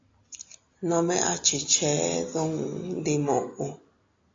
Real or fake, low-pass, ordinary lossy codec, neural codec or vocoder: real; 7.2 kHz; AAC, 48 kbps; none